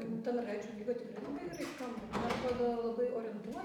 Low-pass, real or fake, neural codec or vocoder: 19.8 kHz; real; none